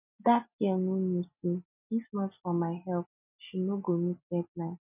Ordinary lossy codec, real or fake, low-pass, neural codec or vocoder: none; real; 3.6 kHz; none